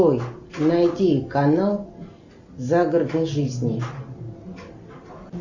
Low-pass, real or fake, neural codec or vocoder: 7.2 kHz; real; none